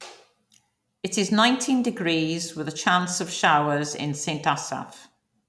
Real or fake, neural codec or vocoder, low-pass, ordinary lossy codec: real; none; none; none